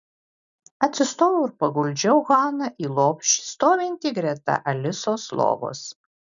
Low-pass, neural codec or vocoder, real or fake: 7.2 kHz; none; real